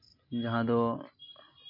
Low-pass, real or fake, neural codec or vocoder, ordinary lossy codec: 5.4 kHz; real; none; MP3, 32 kbps